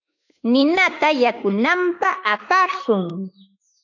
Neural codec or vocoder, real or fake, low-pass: autoencoder, 48 kHz, 32 numbers a frame, DAC-VAE, trained on Japanese speech; fake; 7.2 kHz